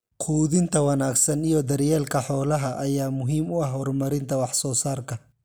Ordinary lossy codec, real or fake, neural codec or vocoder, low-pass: none; real; none; none